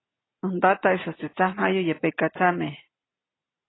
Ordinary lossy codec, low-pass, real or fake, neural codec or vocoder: AAC, 16 kbps; 7.2 kHz; real; none